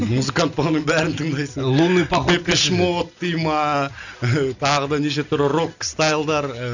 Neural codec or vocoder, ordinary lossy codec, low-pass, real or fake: none; none; 7.2 kHz; real